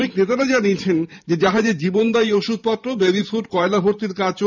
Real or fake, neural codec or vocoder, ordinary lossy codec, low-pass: fake; vocoder, 44.1 kHz, 128 mel bands every 512 samples, BigVGAN v2; none; 7.2 kHz